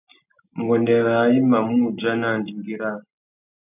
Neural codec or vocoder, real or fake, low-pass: none; real; 3.6 kHz